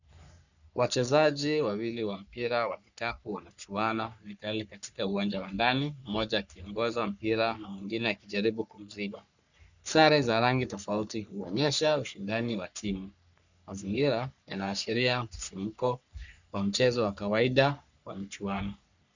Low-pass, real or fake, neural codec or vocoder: 7.2 kHz; fake; codec, 44.1 kHz, 3.4 kbps, Pupu-Codec